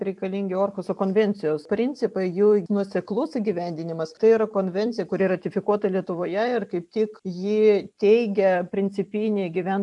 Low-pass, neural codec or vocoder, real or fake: 10.8 kHz; vocoder, 44.1 kHz, 128 mel bands every 256 samples, BigVGAN v2; fake